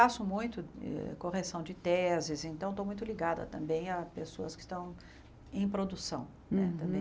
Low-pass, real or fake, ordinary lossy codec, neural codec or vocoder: none; real; none; none